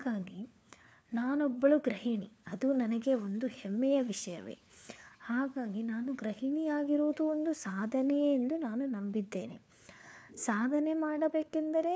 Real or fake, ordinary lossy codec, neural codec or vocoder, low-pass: fake; none; codec, 16 kHz, 4 kbps, FunCodec, trained on LibriTTS, 50 frames a second; none